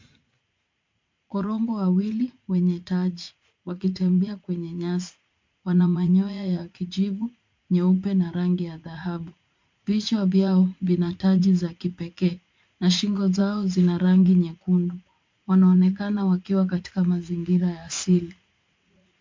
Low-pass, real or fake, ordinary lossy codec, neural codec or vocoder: 7.2 kHz; fake; MP3, 48 kbps; vocoder, 44.1 kHz, 80 mel bands, Vocos